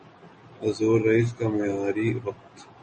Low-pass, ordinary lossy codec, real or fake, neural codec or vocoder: 10.8 kHz; MP3, 32 kbps; real; none